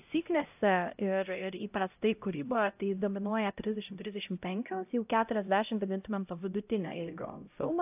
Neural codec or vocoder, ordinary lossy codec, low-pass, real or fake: codec, 16 kHz, 0.5 kbps, X-Codec, HuBERT features, trained on LibriSpeech; AAC, 32 kbps; 3.6 kHz; fake